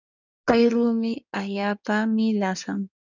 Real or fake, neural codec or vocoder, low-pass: fake; codec, 16 kHz in and 24 kHz out, 2.2 kbps, FireRedTTS-2 codec; 7.2 kHz